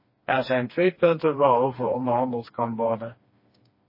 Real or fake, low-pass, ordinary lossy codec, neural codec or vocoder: fake; 5.4 kHz; MP3, 24 kbps; codec, 16 kHz, 2 kbps, FreqCodec, smaller model